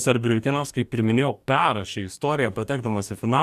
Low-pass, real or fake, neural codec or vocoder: 14.4 kHz; fake; codec, 44.1 kHz, 2.6 kbps, DAC